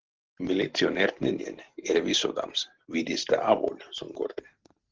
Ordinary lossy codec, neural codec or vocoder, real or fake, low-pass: Opus, 16 kbps; none; real; 7.2 kHz